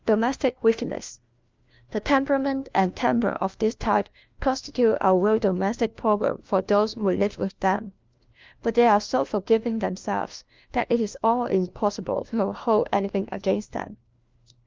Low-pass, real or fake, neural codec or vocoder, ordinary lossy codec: 7.2 kHz; fake; codec, 16 kHz, 1 kbps, FunCodec, trained on LibriTTS, 50 frames a second; Opus, 24 kbps